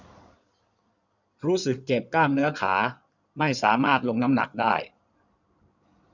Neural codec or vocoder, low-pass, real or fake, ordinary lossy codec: codec, 16 kHz in and 24 kHz out, 2.2 kbps, FireRedTTS-2 codec; 7.2 kHz; fake; none